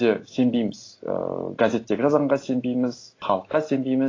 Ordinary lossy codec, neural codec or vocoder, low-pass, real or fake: AAC, 32 kbps; none; 7.2 kHz; real